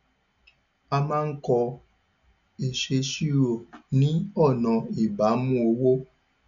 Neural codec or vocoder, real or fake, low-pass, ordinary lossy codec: none; real; 7.2 kHz; none